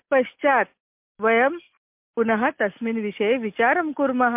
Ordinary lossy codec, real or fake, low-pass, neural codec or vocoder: MP3, 32 kbps; real; 3.6 kHz; none